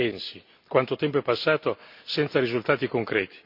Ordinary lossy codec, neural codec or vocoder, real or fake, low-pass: MP3, 48 kbps; none; real; 5.4 kHz